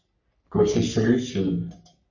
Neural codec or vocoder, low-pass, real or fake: codec, 44.1 kHz, 3.4 kbps, Pupu-Codec; 7.2 kHz; fake